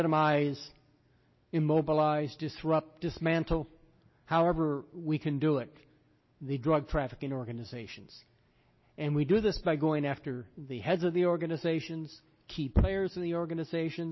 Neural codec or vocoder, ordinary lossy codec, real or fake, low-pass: none; MP3, 24 kbps; real; 7.2 kHz